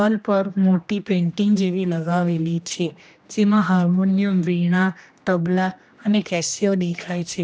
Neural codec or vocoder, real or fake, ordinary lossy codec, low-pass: codec, 16 kHz, 1 kbps, X-Codec, HuBERT features, trained on general audio; fake; none; none